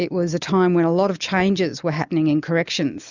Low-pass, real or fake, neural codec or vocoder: 7.2 kHz; real; none